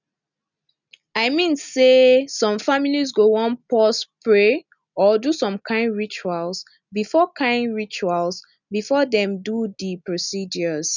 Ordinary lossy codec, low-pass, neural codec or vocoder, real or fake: none; 7.2 kHz; none; real